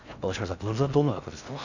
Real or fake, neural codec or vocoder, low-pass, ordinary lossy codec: fake; codec, 16 kHz in and 24 kHz out, 0.6 kbps, FocalCodec, streaming, 4096 codes; 7.2 kHz; none